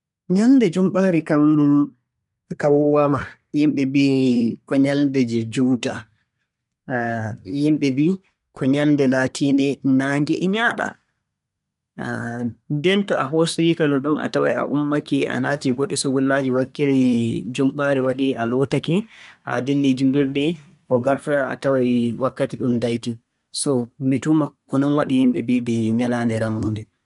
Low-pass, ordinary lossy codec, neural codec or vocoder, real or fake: 10.8 kHz; none; codec, 24 kHz, 1 kbps, SNAC; fake